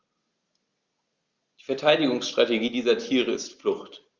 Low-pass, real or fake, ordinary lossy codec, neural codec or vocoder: 7.2 kHz; real; Opus, 32 kbps; none